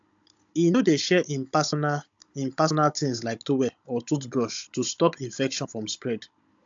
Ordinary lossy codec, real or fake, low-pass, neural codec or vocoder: AAC, 64 kbps; fake; 7.2 kHz; codec, 16 kHz, 16 kbps, FunCodec, trained on Chinese and English, 50 frames a second